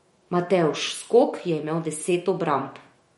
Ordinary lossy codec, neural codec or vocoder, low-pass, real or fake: MP3, 48 kbps; autoencoder, 48 kHz, 128 numbers a frame, DAC-VAE, trained on Japanese speech; 19.8 kHz; fake